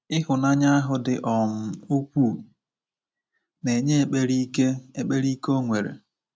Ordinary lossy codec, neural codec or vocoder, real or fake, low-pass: none; none; real; none